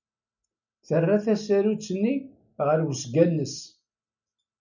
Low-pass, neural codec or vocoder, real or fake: 7.2 kHz; none; real